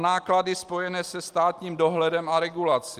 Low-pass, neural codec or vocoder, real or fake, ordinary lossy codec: 14.4 kHz; none; real; Opus, 32 kbps